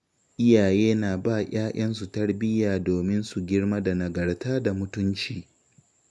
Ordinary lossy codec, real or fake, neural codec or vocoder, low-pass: none; real; none; none